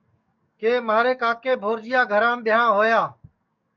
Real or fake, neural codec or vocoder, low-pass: fake; codec, 44.1 kHz, 7.8 kbps, DAC; 7.2 kHz